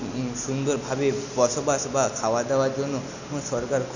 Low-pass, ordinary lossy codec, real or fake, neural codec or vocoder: 7.2 kHz; none; real; none